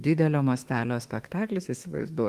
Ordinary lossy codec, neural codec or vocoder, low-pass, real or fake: Opus, 24 kbps; autoencoder, 48 kHz, 32 numbers a frame, DAC-VAE, trained on Japanese speech; 14.4 kHz; fake